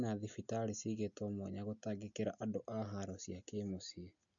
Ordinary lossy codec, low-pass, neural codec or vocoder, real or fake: MP3, 64 kbps; 7.2 kHz; none; real